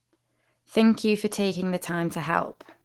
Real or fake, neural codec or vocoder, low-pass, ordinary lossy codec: fake; autoencoder, 48 kHz, 128 numbers a frame, DAC-VAE, trained on Japanese speech; 19.8 kHz; Opus, 16 kbps